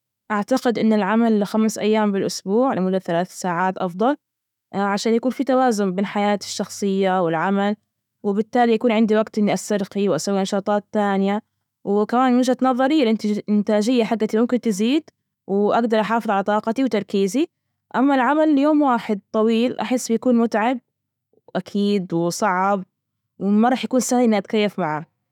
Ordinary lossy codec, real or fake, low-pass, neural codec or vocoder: none; fake; 19.8 kHz; autoencoder, 48 kHz, 128 numbers a frame, DAC-VAE, trained on Japanese speech